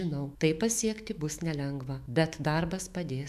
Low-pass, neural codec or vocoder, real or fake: 14.4 kHz; autoencoder, 48 kHz, 128 numbers a frame, DAC-VAE, trained on Japanese speech; fake